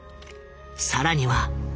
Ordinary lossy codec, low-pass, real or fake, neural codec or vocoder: none; none; real; none